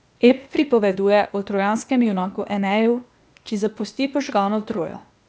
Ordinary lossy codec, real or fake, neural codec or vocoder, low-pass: none; fake; codec, 16 kHz, 0.8 kbps, ZipCodec; none